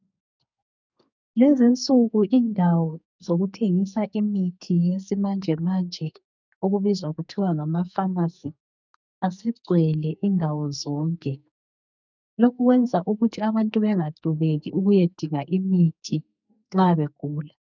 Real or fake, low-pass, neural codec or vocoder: fake; 7.2 kHz; codec, 44.1 kHz, 2.6 kbps, SNAC